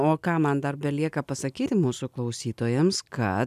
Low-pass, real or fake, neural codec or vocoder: 14.4 kHz; real; none